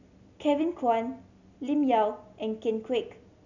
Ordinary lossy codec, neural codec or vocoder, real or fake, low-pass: none; none; real; 7.2 kHz